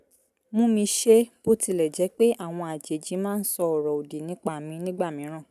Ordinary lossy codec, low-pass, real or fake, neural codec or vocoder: none; 14.4 kHz; real; none